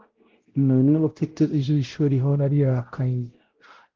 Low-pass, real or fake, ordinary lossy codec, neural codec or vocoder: 7.2 kHz; fake; Opus, 16 kbps; codec, 16 kHz, 0.5 kbps, X-Codec, WavLM features, trained on Multilingual LibriSpeech